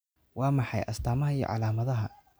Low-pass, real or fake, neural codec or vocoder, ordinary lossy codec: none; real; none; none